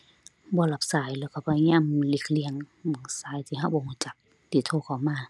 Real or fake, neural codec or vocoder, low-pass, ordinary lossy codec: real; none; none; none